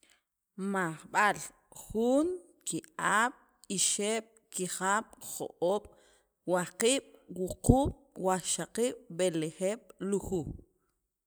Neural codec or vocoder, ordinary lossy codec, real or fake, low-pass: none; none; real; none